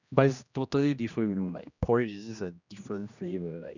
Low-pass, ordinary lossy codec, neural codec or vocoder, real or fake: 7.2 kHz; none; codec, 16 kHz, 1 kbps, X-Codec, HuBERT features, trained on general audio; fake